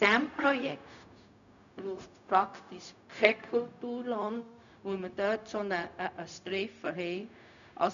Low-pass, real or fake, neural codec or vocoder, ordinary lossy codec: 7.2 kHz; fake; codec, 16 kHz, 0.4 kbps, LongCat-Audio-Codec; none